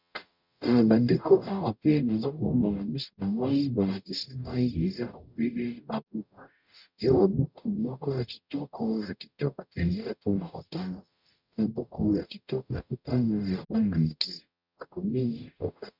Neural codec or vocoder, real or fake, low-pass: codec, 44.1 kHz, 0.9 kbps, DAC; fake; 5.4 kHz